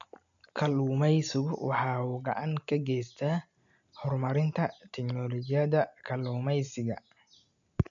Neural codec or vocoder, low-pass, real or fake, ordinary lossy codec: none; 7.2 kHz; real; none